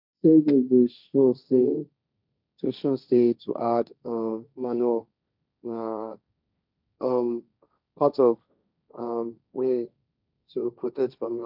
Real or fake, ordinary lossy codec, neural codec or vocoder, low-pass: fake; none; codec, 16 kHz, 1.1 kbps, Voila-Tokenizer; 5.4 kHz